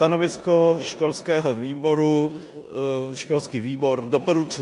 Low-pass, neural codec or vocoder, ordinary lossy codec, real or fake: 10.8 kHz; codec, 16 kHz in and 24 kHz out, 0.9 kbps, LongCat-Audio-Codec, four codebook decoder; AAC, 64 kbps; fake